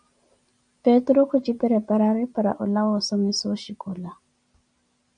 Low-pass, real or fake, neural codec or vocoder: 9.9 kHz; real; none